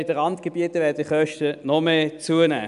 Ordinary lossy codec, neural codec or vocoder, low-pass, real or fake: none; none; 10.8 kHz; real